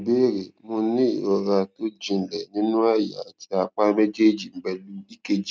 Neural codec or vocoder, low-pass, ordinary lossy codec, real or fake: none; none; none; real